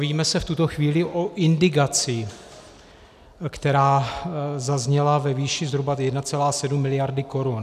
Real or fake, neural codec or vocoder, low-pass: real; none; 14.4 kHz